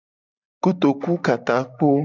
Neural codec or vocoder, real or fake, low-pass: none; real; 7.2 kHz